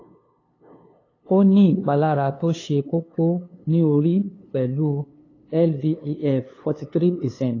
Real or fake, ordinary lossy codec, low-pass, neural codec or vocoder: fake; AAC, 32 kbps; 7.2 kHz; codec, 16 kHz, 2 kbps, FunCodec, trained on LibriTTS, 25 frames a second